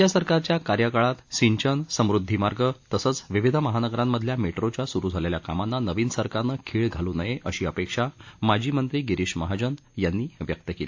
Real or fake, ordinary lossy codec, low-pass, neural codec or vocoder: real; AAC, 48 kbps; 7.2 kHz; none